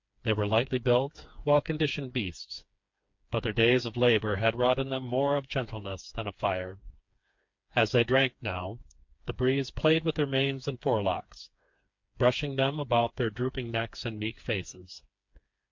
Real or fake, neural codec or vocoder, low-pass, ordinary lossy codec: fake; codec, 16 kHz, 4 kbps, FreqCodec, smaller model; 7.2 kHz; MP3, 48 kbps